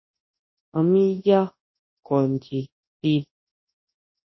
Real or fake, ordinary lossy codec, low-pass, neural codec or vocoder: fake; MP3, 24 kbps; 7.2 kHz; codec, 24 kHz, 0.9 kbps, WavTokenizer, large speech release